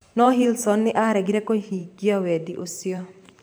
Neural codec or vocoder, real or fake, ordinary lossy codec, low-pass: vocoder, 44.1 kHz, 128 mel bands every 512 samples, BigVGAN v2; fake; none; none